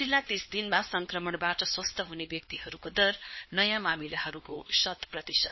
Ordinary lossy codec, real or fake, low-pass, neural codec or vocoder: MP3, 24 kbps; fake; 7.2 kHz; codec, 16 kHz, 2 kbps, X-Codec, HuBERT features, trained on LibriSpeech